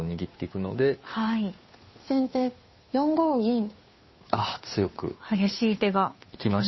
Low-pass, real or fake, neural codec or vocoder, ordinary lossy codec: 7.2 kHz; fake; codec, 16 kHz, 2 kbps, FunCodec, trained on Chinese and English, 25 frames a second; MP3, 24 kbps